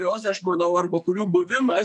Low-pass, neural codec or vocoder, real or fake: 10.8 kHz; codec, 44.1 kHz, 2.6 kbps, SNAC; fake